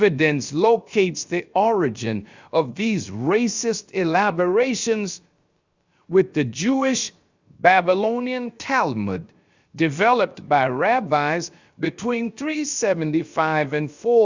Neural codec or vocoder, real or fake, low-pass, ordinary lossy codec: codec, 16 kHz, 0.7 kbps, FocalCodec; fake; 7.2 kHz; Opus, 64 kbps